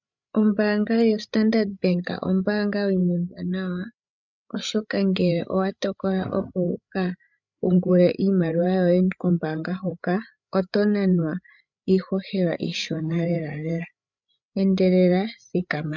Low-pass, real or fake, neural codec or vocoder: 7.2 kHz; fake; codec, 16 kHz, 8 kbps, FreqCodec, larger model